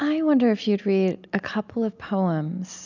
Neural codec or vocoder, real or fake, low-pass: none; real; 7.2 kHz